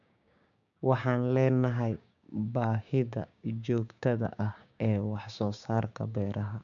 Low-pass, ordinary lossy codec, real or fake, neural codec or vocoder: 7.2 kHz; none; fake; codec, 16 kHz, 6 kbps, DAC